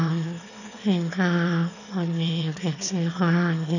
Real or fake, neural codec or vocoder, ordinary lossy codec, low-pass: fake; autoencoder, 22.05 kHz, a latent of 192 numbers a frame, VITS, trained on one speaker; none; 7.2 kHz